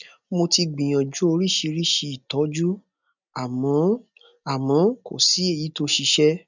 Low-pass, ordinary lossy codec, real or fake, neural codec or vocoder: 7.2 kHz; none; real; none